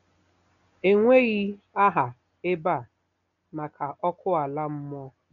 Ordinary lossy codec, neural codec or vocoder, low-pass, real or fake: none; none; 7.2 kHz; real